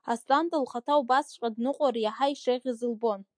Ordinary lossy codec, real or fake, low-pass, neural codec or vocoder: MP3, 64 kbps; real; 9.9 kHz; none